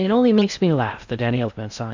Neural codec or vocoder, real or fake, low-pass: codec, 16 kHz in and 24 kHz out, 0.6 kbps, FocalCodec, streaming, 4096 codes; fake; 7.2 kHz